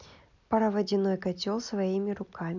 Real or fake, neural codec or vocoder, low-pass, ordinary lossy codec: real; none; 7.2 kHz; none